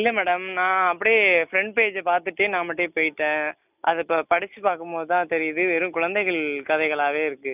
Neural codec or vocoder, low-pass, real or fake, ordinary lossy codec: none; 3.6 kHz; real; none